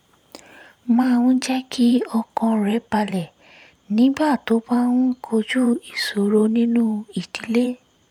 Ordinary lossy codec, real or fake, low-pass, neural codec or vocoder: none; fake; 19.8 kHz; vocoder, 44.1 kHz, 128 mel bands every 512 samples, BigVGAN v2